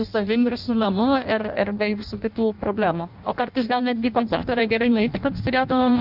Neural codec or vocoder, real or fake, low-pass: codec, 16 kHz in and 24 kHz out, 0.6 kbps, FireRedTTS-2 codec; fake; 5.4 kHz